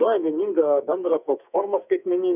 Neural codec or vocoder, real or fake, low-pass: codec, 44.1 kHz, 2.6 kbps, SNAC; fake; 3.6 kHz